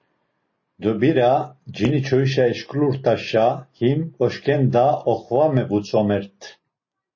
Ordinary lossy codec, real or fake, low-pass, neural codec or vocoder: MP3, 32 kbps; real; 7.2 kHz; none